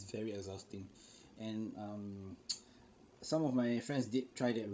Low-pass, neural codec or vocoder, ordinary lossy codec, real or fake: none; codec, 16 kHz, 16 kbps, FreqCodec, larger model; none; fake